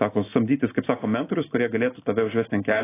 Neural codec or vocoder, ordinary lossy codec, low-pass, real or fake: none; AAC, 16 kbps; 3.6 kHz; real